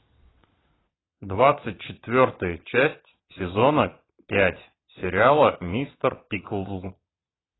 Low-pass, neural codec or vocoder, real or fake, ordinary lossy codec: 7.2 kHz; vocoder, 22.05 kHz, 80 mel bands, Vocos; fake; AAC, 16 kbps